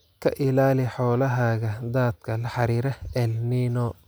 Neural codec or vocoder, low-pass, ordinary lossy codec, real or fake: none; none; none; real